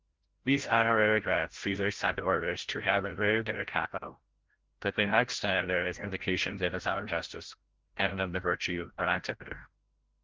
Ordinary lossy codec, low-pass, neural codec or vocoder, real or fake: Opus, 16 kbps; 7.2 kHz; codec, 16 kHz, 0.5 kbps, FreqCodec, larger model; fake